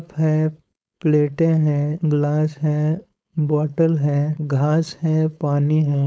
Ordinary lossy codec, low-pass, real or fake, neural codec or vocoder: none; none; fake; codec, 16 kHz, 4.8 kbps, FACodec